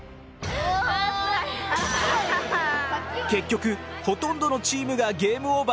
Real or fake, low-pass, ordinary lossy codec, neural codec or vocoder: real; none; none; none